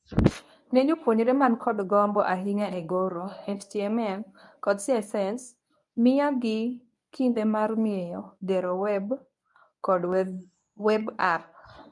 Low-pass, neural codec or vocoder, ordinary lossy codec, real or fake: none; codec, 24 kHz, 0.9 kbps, WavTokenizer, medium speech release version 1; none; fake